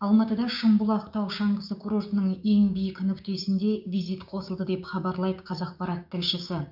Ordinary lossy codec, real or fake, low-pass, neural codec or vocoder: none; fake; 5.4 kHz; codec, 16 kHz, 6 kbps, DAC